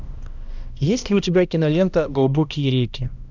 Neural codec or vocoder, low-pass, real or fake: codec, 16 kHz, 1 kbps, X-Codec, HuBERT features, trained on balanced general audio; 7.2 kHz; fake